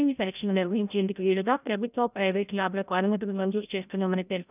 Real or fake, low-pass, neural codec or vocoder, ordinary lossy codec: fake; 3.6 kHz; codec, 16 kHz, 0.5 kbps, FreqCodec, larger model; none